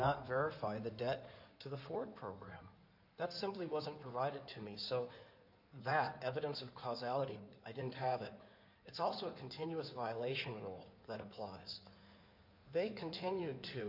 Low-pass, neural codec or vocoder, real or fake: 5.4 kHz; codec, 16 kHz in and 24 kHz out, 2.2 kbps, FireRedTTS-2 codec; fake